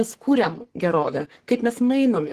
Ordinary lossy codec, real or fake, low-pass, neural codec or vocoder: Opus, 16 kbps; fake; 14.4 kHz; codec, 44.1 kHz, 3.4 kbps, Pupu-Codec